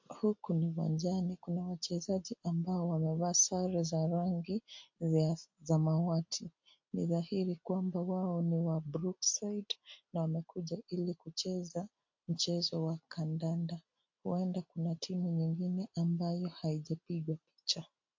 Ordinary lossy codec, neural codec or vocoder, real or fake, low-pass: MP3, 48 kbps; none; real; 7.2 kHz